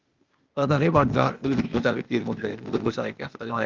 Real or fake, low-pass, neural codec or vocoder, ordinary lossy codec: fake; 7.2 kHz; codec, 16 kHz, 0.8 kbps, ZipCodec; Opus, 24 kbps